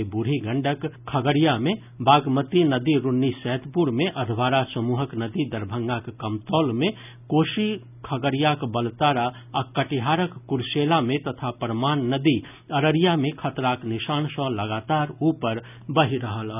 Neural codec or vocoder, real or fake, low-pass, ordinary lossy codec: none; real; 3.6 kHz; none